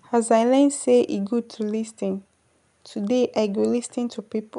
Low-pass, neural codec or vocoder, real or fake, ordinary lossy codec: 10.8 kHz; none; real; none